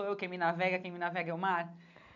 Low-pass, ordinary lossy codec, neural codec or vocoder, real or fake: 7.2 kHz; none; none; real